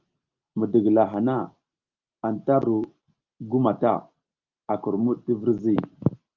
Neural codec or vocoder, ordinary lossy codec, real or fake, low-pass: none; Opus, 32 kbps; real; 7.2 kHz